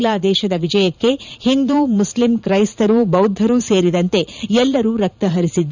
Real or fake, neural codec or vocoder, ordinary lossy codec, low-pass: fake; vocoder, 44.1 kHz, 80 mel bands, Vocos; none; 7.2 kHz